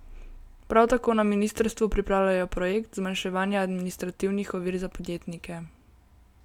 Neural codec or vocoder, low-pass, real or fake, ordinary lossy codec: none; 19.8 kHz; real; none